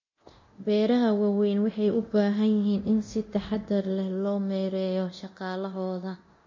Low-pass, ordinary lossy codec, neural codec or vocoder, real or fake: 7.2 kHz; MP3, 32 kbps; codec, 24 kHz, 0.9 kbps, DualCodec; fake